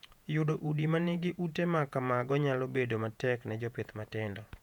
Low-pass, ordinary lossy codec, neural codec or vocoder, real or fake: 19.8 kHz; none; vocoder, 48 kHz, 128 mel bands, Vocos; fake